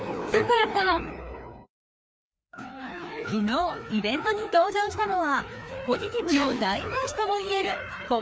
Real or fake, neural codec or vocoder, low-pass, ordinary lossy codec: fake; codec, 16 kHz, 2 kbps, FreqCodec, larger model; none; none